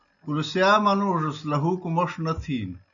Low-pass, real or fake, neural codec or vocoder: 7.2 kHz; real; none